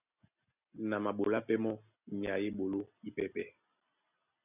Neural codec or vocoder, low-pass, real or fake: none; 3.6 kHz; real